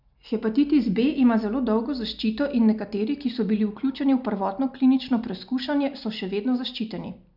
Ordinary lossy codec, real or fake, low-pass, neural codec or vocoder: none; real; 5.4 kHz; none